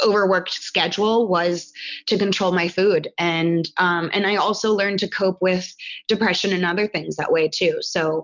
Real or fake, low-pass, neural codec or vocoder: real; 7.2 kHz; none